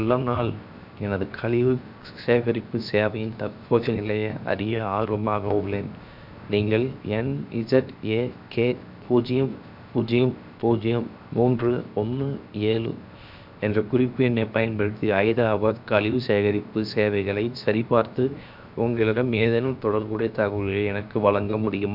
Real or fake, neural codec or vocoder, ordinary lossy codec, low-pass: fake; codec, 16 kHz, 0.7 kbps, FocalCodec; none; 5.4 kHz